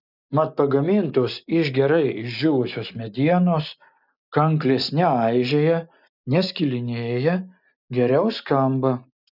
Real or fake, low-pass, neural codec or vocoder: real; 5.4 kHz; none